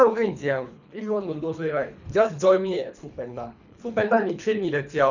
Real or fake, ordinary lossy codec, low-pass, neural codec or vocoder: fake; none; 7.2 kHz; codec, 24 kHz, 3 kbps, HILCodec